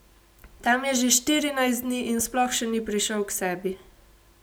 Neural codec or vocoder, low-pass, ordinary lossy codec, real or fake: none; none; none; real